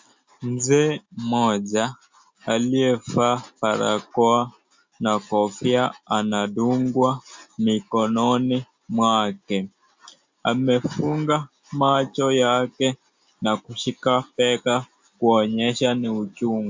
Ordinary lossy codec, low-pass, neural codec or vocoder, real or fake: MP3, 64 kbps; 7.2 kHz; none; real